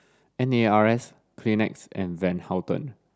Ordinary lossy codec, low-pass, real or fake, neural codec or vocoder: none; none; real; none